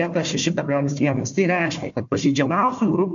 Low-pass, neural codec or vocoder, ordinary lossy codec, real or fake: 7.2 kHz; codec, 16 kHz, 1 kbps, FunCodec, trained on Chinese and English, 50 frames a second; MP3, 64 kbps; fake